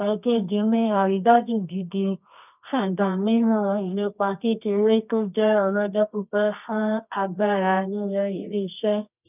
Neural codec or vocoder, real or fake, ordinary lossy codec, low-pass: codec, 24 kHz, 0.9 kbps, WavTokenizer, medium music audio release; fake; none; 3.6 kHz